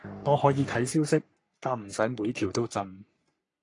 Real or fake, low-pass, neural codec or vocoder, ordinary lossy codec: fake; 10.8 kHz; codec, 44.1 kHz, 3.4 kbps, Pupu-Codec; AAC, 48 kbps